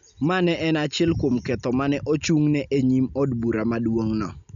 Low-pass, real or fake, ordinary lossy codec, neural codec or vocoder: 7.2 kHz; real; none; none